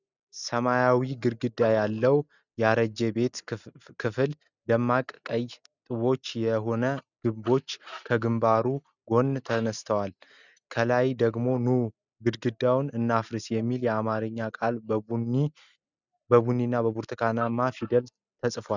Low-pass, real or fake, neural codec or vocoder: 7.2 kHz; real; none